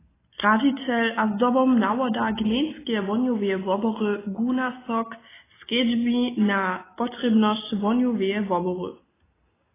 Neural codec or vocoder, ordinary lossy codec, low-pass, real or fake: none; AAC, 16 kbps; 3.6 kHz; real